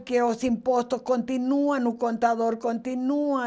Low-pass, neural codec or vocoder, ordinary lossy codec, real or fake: none; none; none; real